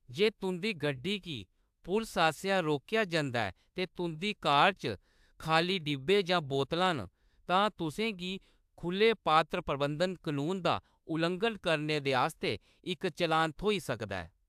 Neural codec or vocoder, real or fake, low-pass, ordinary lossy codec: autoencoder, 48 kHz, 32 numbers a frame, DAC-VAE, trained on Japanese speech; fake; 14.4 kHz; AAC, 96 kbps